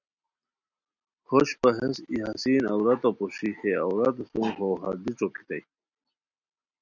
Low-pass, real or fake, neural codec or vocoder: 7.2 kHz; real; none